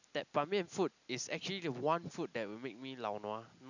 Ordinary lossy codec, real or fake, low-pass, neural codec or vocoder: none; real; 7.2 kHz; none